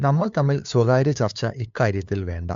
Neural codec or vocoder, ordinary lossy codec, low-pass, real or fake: codec, 16 kHz, 2 kbps, FunCodec, trained on LibriTTS, 25 frames a second; MP3, 64 kbps; 7.2 kHz; fake